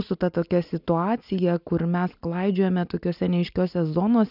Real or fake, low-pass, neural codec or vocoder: real; 5.4 kHz; none